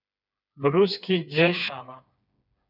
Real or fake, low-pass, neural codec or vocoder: fake; 5.4 kHz; codec, 16 kHz, 4 kbps, FreqCodec, smaller model